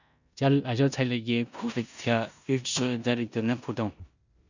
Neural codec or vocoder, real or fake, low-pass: codec, 16 kHz in and 24 kHz out, 0.9 kbps, LongCat-Audio-Codec, four codebook decoder; fake; 7.2 kHz